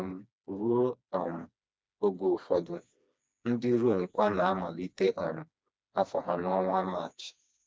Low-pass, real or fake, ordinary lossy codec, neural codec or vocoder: none; fake; none; codec, 16 kHz, 2 kbps, FreqCodec, smaller model